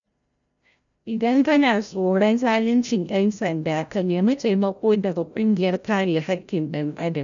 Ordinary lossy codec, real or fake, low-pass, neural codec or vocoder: none; fake; 7.2 kHz; codec, 16 kHz, 0.5 kbps, FreqCodec, larger model